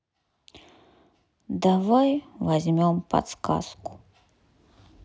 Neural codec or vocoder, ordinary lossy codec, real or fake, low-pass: none; none; real; none